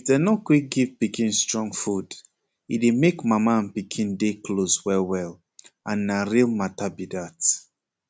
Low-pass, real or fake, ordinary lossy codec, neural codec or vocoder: none; real; none; none